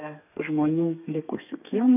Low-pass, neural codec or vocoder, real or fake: 3.6 kHz; codec, 32 kHz, 1.9 kbps, SNAC; fake